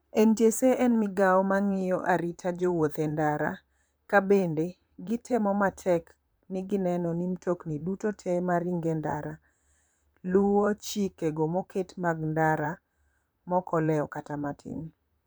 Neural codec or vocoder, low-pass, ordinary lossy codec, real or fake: vocoder, 44.1 kHz, 128 mel bands, Pupu-Vocoder; none; none; fake